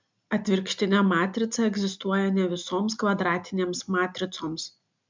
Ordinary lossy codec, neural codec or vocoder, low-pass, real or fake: MP3, 64 kbps; none; 7.2 kHz; real